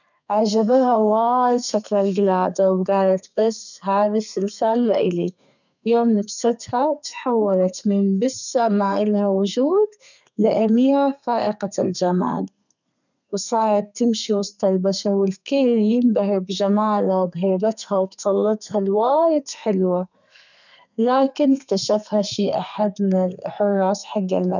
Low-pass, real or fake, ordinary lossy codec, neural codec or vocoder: 7.2 kHz; fake; none; codec, 32 kHz, 1.9 kbps, SNAC